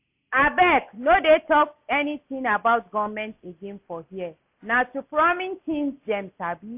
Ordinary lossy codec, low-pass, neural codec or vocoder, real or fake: AAC, 32 kbps; 3.6 kHz; none; real